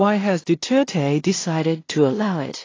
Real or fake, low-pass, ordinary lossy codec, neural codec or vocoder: fake; 7.2 kHz; AAC, 32 kbps; codec, 16 kHz in and 24 kHz out, 0.4 kbps, LongCat-Audio-Codec, two codebook decoder